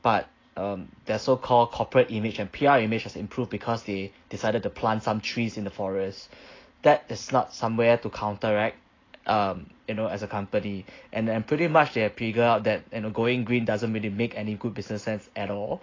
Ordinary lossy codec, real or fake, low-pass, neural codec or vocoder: AAC, 32 kbps; real; 7.2 kHz; none